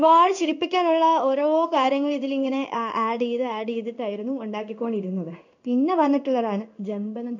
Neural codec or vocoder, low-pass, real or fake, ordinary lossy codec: codec, 16 kHz in and 24 kHz out, 1 kbps, XY-Tokenizer; 7.2 kHz; fake; none